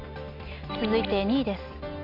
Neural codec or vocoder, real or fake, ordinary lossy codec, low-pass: none; real; none; 5.4 kHz